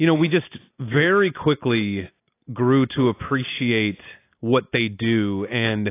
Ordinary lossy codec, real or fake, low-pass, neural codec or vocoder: AAC, 24 kbps; real; 3.6 kHz; none